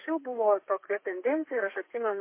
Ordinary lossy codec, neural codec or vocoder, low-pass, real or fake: MP3, 24 kbps; codec, 32 kHz, 1.9 kbps, SNAC; 3.6 kHz; fake